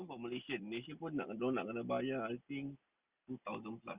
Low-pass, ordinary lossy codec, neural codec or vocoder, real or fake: 3.6 kHz; Opus, 64 kbps; none; real